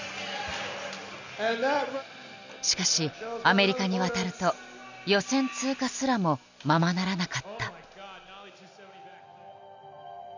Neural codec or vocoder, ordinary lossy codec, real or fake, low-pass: none; none; real; 7.2 kHz